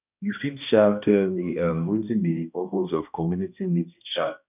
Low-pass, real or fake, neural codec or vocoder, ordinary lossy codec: 3.6 kHz; fake; codec, 16 kHz, 1 kbps, X-Codec, HuBERT features, trained on balanced general audio; none